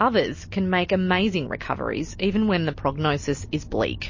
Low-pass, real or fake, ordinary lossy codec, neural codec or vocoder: 7.2 kHz; real; MP3, 32 kbps; none